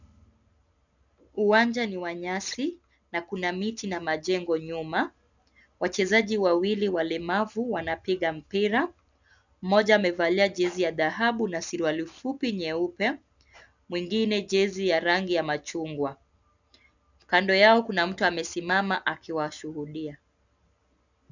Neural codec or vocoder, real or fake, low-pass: none; real; 7.2 kHz